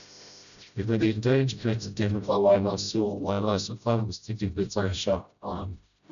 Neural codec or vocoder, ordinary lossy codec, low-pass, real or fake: codec, 16 kHz, 0.5 kbps, FreqCodec, smaller model; none; 7.2 kHz; fake